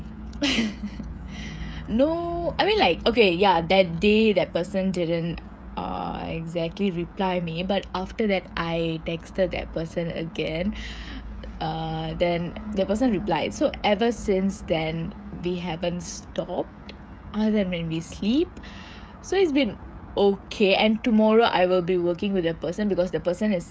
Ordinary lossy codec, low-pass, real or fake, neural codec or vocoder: none; none; fake; codec, 16 kHz, 16 kbps, FreqCodec, smaller model